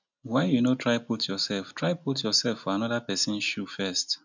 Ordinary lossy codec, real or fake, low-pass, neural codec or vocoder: none; real; 7.2 kHz; none